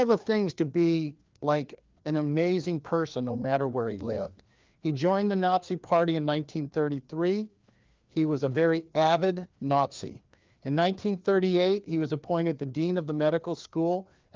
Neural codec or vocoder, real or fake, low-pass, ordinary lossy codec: codec, 16 kHz, 2 kbps, FreqCodec, larger model; fake; 7.2 kHz; Opus, 32 kbps